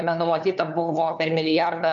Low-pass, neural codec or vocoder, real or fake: 7.2 kHz; codec, 16 kHz, 2 kbps, FunCodec, trained on LibriTTS, 25 frames a second; fake